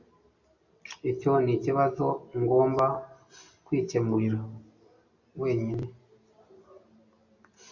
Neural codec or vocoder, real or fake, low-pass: none; real; 7.2 kHz